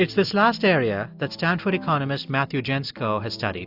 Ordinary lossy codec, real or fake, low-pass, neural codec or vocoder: AAC, 48 kbps; real; 5.4 kHz; none